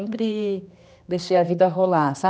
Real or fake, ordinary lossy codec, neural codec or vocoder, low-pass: fake; none; codec, 16 kHz, 2 kbps, X-Codec, HuBERT features, trained on general audio; none